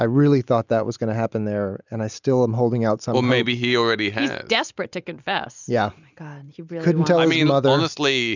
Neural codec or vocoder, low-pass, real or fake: none; 7.2 kHz; real